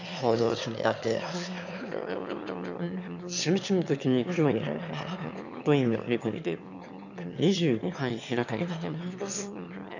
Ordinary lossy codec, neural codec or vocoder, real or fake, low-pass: none; autoencoder, 22.05 kHz, a latent of 192 numbers a frame, VITS, trained on one speaker; fake; 7.2 kHz